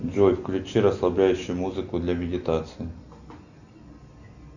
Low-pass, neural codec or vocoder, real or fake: 7.2 kHz; none; real